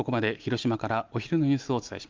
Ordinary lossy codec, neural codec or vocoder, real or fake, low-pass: Opus, 24 kbps; none; real; 7.2 kHz